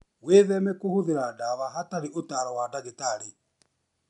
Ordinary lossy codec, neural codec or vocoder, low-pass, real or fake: none; none; 10.8 kHz; real